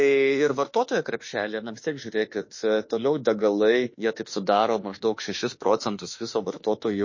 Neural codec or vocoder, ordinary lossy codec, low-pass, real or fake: autoencoder, 48 kHz, 32 numbers a frame, DAC-VAE, trained on Japanese speech; MP3, 32 kbps; 7.2 kHz; fake